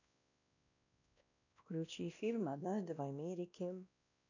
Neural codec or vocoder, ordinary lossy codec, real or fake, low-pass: codec, 16 kHz, 1 kbps, X-Codec, WavLM features, trained on Multilingual LibriSpeech; none; fake; 7.2 kHz